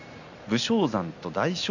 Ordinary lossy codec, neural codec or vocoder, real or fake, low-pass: none; none; real; 7.2 kHz